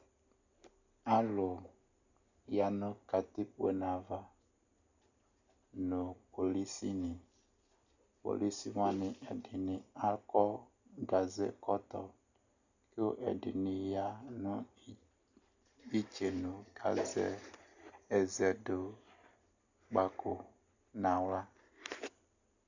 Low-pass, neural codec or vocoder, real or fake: 7.2 kHz; none; real